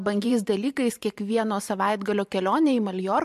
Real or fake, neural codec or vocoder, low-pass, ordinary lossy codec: fake; vocoder, 44.1 kHz, 128 mel bands every 512 samples, BigVGAN v2; 14.4 kHz; MP3, 64 kbps